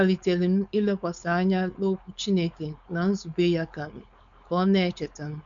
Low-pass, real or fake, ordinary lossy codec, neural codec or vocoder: 7.2 kHz; fake; none; codec, 16 kHz, 4.8 kbps, FACodec